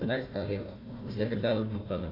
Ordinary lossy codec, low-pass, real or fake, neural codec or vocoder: AAC, 32 kbps; 5.4 kHz; fake; codec, 16 kHz, 1 kbps, FunCodec, trained on Chinese and English, 50 frames a second